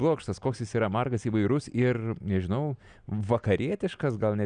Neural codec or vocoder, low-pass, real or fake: none; 9.9 kHz; real